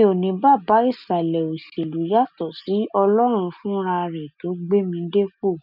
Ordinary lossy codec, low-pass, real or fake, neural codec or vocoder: none; 5.4 kHz; real; none